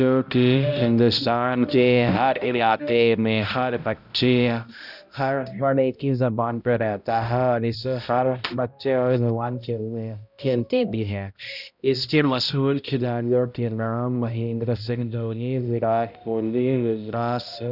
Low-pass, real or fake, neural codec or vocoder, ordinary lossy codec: 5.4 kHz; fake; codec, 16 kHz, 0.5 kbps, X-Codec, HuBERT features, trained on balanced general audio; none